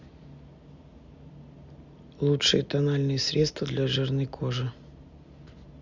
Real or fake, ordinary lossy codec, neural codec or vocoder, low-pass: real; Opus, 64 kbps; none; 7.2 kHz